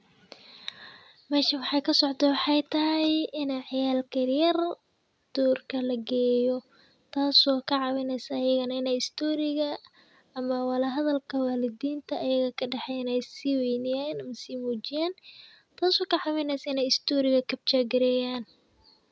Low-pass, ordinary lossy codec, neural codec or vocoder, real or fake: none; none; none; real